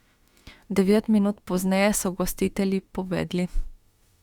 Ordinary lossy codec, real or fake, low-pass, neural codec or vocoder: Opus, 64 kbps; fake; 19.8 kHz; autoencoder, 48 kHz, 32 numbers a frame, DAC-VAE, trained on Japanese speech